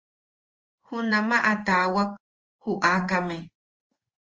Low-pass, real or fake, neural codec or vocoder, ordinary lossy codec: 7.2 kHz; fake; codec, 16 kHz in and 24 kHz out, 1 kbps, XY-Tokenizer; Opus, 24 kbps